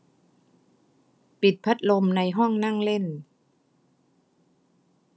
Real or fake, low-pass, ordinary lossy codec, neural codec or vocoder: real; none; none; none